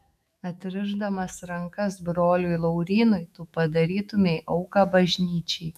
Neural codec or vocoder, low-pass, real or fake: autoencoder, 48 kHz, 128 numbers a frame, DAC-VAE, trained on Japanese speech; 14.4 kHz; fake